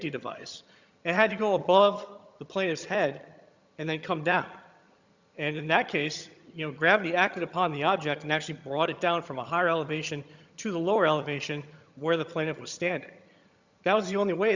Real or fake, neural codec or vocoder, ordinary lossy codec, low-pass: fake; vocoder, 22.05 kHz, 80 mel bands, HiFi-GAN; Opus, 64 kbps; 7.2 kHz